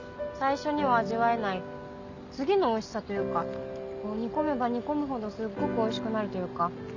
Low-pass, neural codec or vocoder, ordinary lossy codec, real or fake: 7.2 kHz; none; none; real